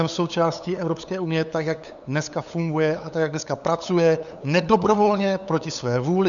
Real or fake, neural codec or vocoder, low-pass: fake; codec, 16 kHz, 8 kbps, FunCodec, trained on LibriTTS, 25 frames a second; 7.2 kHz